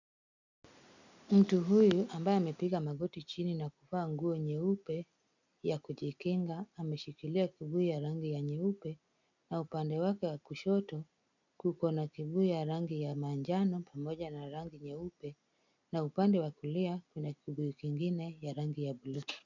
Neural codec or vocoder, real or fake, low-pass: none; real; 7.2 kHz